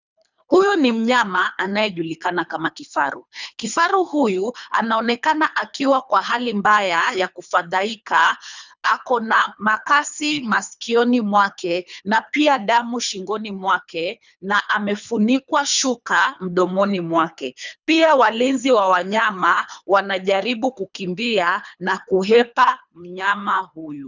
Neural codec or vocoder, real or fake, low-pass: codec, 24 kHz, 3 kbps, HILCodec; fake; 7.2 kHz